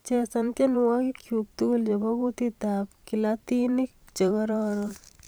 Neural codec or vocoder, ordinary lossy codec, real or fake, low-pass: vocoder, 44.1 kHz, 128 mel bands every 512 samples, BigVGAN v2; none; fake; none